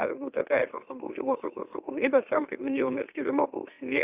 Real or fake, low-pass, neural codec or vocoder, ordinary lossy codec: fake; 3.6 kHz; autoencoder, 44.1 kHz, a latent of 192 numbers a frame, MeloTTS; Opus, 64 kbps